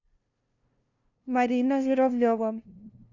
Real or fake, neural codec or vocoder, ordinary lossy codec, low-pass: fake; codec, 16 kHz, 0.5 kbps, FunCodec, trained on LibriTTS, 25 frames a second; none; 7.2 kHz